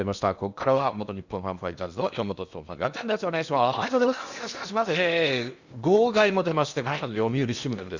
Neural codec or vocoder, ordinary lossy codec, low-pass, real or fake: codec, 16 kHz in and 24 kHz out, 0.8 kbps, FocalCodec, streaming, 65536 codes; Opus, 64 kbps; 7.2 kHz; fake